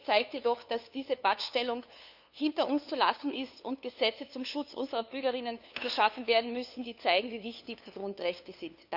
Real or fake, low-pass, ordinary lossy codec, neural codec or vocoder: fake; 5.4 kHz; none; codec, 16 kHz, 2 kbps, FunCodec, trained on LibriTTS, 25 frames a second